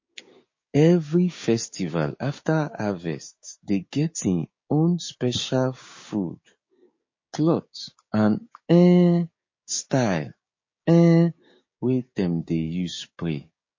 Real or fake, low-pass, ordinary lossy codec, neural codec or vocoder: fake; 7.2 kHz; MP3, 32 kbps; codec, 16 kHz, 6 kbps, DAC